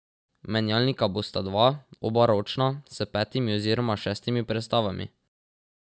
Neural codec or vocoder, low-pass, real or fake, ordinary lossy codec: none; none; real; none